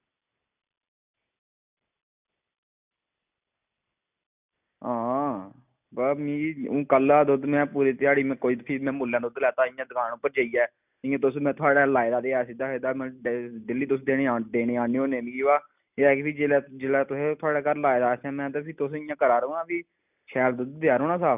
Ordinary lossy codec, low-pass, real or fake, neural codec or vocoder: none; 3.6 kHz; real; none